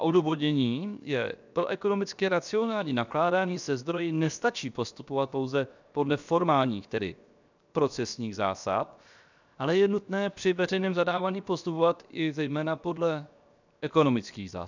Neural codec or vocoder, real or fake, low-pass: codec, 16 kHz, 0.7 kbps, FocalCodec; fake; 7.2 kHz